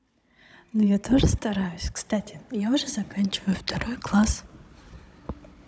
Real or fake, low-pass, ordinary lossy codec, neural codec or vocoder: fake; none; none; codec, 16 kHz, 16 kbps, FunCodec, trained on Chinese and English, 50 frames a second